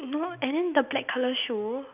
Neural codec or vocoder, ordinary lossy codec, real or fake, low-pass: none; none; real; 3.6 kHz